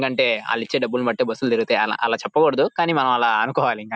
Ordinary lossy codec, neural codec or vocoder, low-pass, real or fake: none; none; none; real